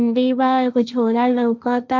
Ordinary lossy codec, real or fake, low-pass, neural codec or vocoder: none; fake; none; codec, 16 kHz, 1.1 kbps, Voila-Tokenizer